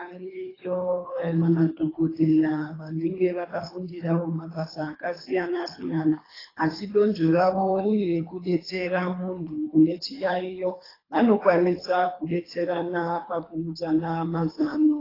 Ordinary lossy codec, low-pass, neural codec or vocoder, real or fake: AAC, 24 kbps; 5.4 kHz; codec, 24 kHz, 3 kbps, HILCodec; fake